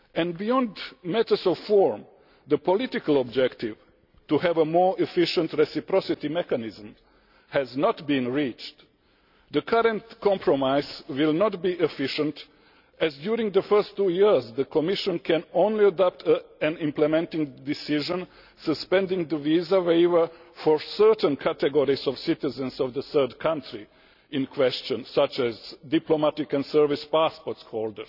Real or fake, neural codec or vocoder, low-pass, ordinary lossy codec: real; none; 5.4 kHz; none